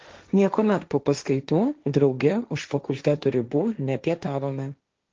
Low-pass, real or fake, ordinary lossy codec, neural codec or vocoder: 7.2 kHz; fake; Opus, 24 kbps; codec, 16 kHz, 1.1 kbps, Voila-Tokenizer